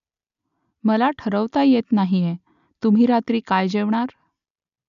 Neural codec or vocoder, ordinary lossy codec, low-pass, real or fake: none; none; 7.2 kHz; real